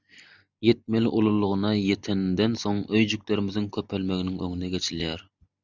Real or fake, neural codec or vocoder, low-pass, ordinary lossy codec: real; none; 7.2 kHz; Opus, 64 kbps